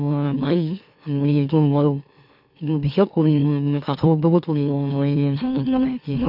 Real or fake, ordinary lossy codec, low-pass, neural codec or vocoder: fake; none; 5.4 kHz; autoencoder, 44.1 kHz, a latent of 192 numbers a frame, MeloTTS